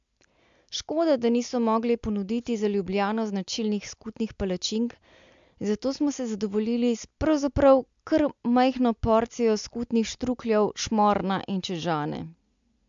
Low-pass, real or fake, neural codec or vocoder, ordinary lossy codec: 7.2 kHz; real; none; MP3, 64 kbps